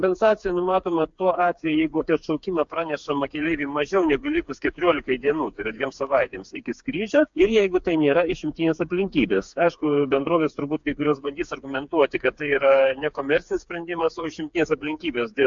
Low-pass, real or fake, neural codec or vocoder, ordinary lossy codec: 7.2 kHz; fake; codec, 16 kHz, 4 kbps, FreqCodec, smaller model; MP3, 64 kbps